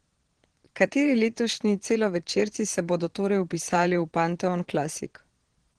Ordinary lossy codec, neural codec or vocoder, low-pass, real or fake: Opus, 16 kbps; vocoder, 22.05 kHz, 80 mel bands, Vocos; 9.9 kHz; fake